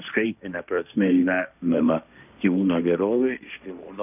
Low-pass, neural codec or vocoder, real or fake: 3.6 kHz; codec, 16 kHz, 1.1 kbps, Voila-Tokenizer; fake